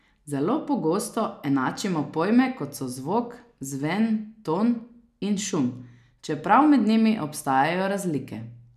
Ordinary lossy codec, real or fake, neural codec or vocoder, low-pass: none; real; none; 14.4 kHz